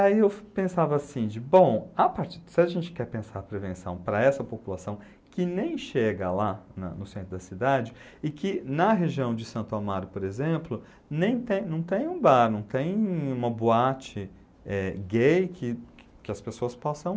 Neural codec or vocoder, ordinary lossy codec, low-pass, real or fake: none; none; none; real